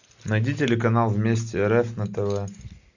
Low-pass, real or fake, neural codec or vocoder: 7.2 kHz; real; none